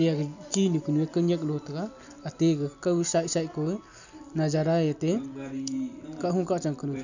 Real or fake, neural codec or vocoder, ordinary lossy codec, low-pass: real; none; none; 7.2 kHz